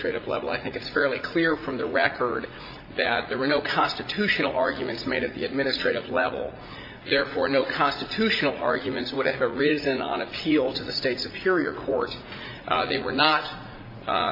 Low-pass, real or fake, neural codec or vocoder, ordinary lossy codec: 5.4 kHz; fake; vocoder, 44.1 kHz, 80 mel bands, Vocos; MP3, 32 kbps